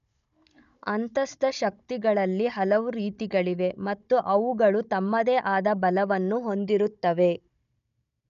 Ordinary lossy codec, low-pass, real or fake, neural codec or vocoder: none; 7.2 kHz; fake; codec, 16 kHz, 16 kbps, FunCodec, trained on Chinese and English, 50 frames a second